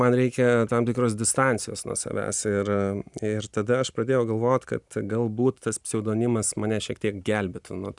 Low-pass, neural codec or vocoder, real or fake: 10.8 kHz; none; real